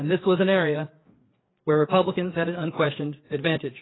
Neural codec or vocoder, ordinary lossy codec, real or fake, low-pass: codec, 16 kHz, 4 kbps, FreqCodec, larger model; AAC, 16 kbps; fake; 7.2 kHz